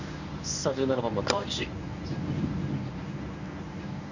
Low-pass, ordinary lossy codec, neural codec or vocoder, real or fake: 7.2 kHz; none; codec, 24 kHz, 0.9 kbps, WavTokenizer, medium speech release version 1; fake